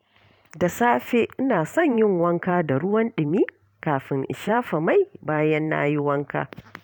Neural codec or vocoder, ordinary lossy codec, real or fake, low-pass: vocoder, 44.1 kHz, 128 mel bands every 512 samples, BigVGAN v2; none; fake; 19.8 kHz